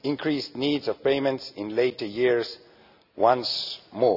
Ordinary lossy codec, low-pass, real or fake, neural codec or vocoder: none; 5.4 kHz; real; none